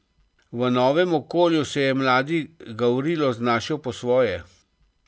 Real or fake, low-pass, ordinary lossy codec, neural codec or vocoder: real; none; none; none